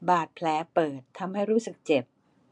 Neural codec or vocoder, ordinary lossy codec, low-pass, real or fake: none; MP3, 64 kbps; 10.8 kHz; real